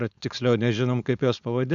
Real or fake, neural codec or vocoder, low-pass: fake; codec, 16 kHz, 4.8 kbps, FACodec; 7.2 kHz